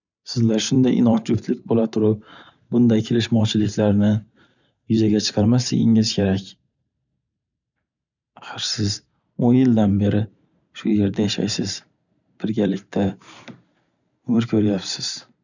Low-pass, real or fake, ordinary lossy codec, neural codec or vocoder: 7.2 kHz; fake; none; vocoder, 44.1 kHz, 128 mel bands every 512 samples, BigVGAN v2